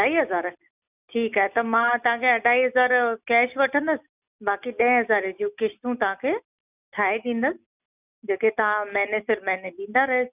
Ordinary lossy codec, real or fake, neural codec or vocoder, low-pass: none; real; none; 3.6 kHz